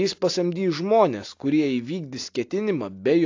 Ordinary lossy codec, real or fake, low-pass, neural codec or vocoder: AAC, 48 kbps; real; 7.2 kHz; none